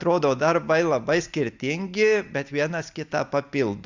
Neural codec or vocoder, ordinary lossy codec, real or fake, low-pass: none; Opus, 64 kbps; real; 7.2 kHz